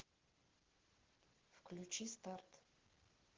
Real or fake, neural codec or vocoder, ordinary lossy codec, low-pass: real; none; Opus, 16 kbps; 7.2 kHz